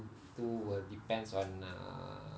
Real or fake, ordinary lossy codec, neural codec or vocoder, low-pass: real; none; none; none